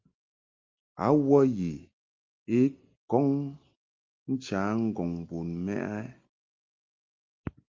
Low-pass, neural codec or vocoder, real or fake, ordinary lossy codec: 7.2 kHz; none; real; Opus, 32 kbps